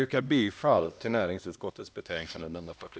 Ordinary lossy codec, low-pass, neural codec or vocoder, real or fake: none; none; codec, 16 kHz, 1 kbps, X-Codec, HuBERT features, trained on LibriSpeech; fake